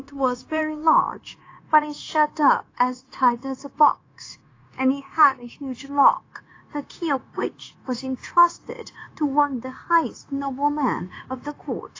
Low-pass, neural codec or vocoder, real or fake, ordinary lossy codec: 7.2 kHz; codec, 16 kHz, 0.9 kbps, LongCat-Audio-Codec; fake; AAC, 32 kbps